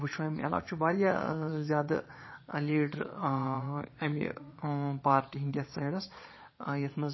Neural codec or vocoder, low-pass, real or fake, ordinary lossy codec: vocoder, 22.05 kHz, 80 mel bands, Vocos; 7.2 kHz; fake; MP3, 24 kbps